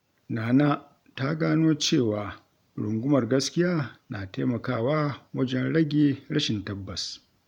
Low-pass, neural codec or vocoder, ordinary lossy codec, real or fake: 19.8 kHz; vocoder, 44.1 kHz, 128 mel bands every 512 samples, BigVGAN v2; none; fake